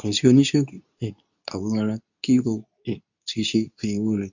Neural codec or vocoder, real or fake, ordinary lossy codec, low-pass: codec, 24 kHz, 0.9 kbps, WavTokenizer, medium speech release version 2; fake; none; 7.2 kHz